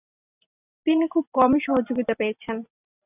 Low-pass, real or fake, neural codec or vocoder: 3.6 kHz; fake; vocoder, 44.1 kHz, 128 mel bands every 256 samples, BigVGAN v2